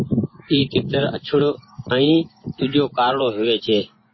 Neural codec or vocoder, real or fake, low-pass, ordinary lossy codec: vocoder, 24 kHz, 100 mel bands, Vocos; fake; 7.2 kHz; MP3, 24 kbps